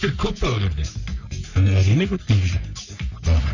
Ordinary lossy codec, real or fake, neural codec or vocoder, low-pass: none; fake; codec, 44.1 kHz, 3.4 kbps, Pupu-Codec; 7.2 kHz